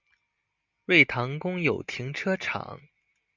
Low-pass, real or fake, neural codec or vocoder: 7.2 kHz; real; none